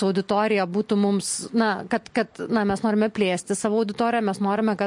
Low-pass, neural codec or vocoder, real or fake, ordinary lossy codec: 10.8 kHz; none; real; MP3, 48 kbps